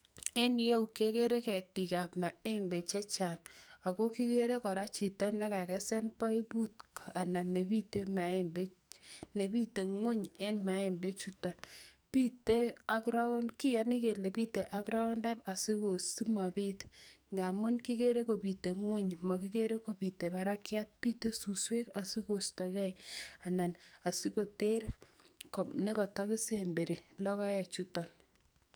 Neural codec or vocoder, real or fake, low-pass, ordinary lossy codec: codec, 44.1 kHz, 2.6 kbps, SNAC; fake; none; none